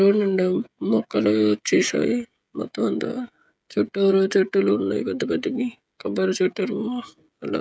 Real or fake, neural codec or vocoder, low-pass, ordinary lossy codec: fake; codec, 16 kHz, 16 kbps, FreqCodec, smaller model; none; none